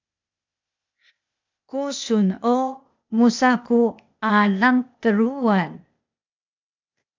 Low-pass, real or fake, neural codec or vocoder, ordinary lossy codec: 7.2 kHz; fake; codec, 16 kHz, 0.8 kbps, ZipCodec; MP3, 64 kbps